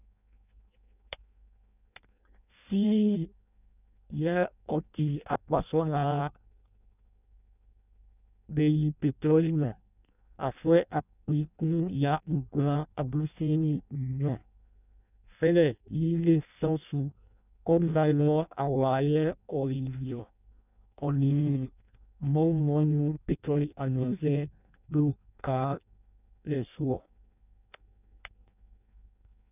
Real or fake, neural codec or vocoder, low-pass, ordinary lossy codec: fake; codec, 16 kHz in and 24 kHz out, 0.6 kbps, FireRedTTS-2 codec; 3.6 kHz; none